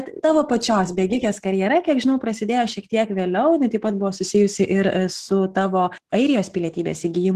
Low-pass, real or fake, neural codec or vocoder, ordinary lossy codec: 14.4 kHz; fake; autoencoder, 48 kHz, 128 numbers a frame, DAC-VAE, trained on Japanese speech; Opus, 16 kbps